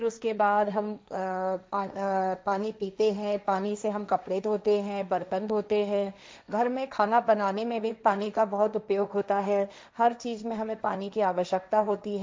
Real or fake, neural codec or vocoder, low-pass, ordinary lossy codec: fake; codec, 16 kHz, 1.1 kbps, Voila-Tokenizer; none; none